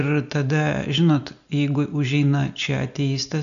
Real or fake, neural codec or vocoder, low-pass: real; none; 7.2 kHz